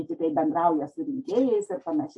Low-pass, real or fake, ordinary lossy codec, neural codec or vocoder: 10.8 kHz; real; AAC, 64 kbps; none